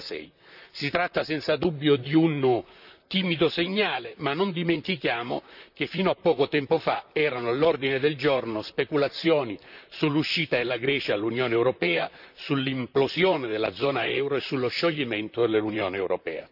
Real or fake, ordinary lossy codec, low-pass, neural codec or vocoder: fake; none; 5.4 kHz; vocoder, 44.1 kHz, 128 mel bands, Pupu-Vocoder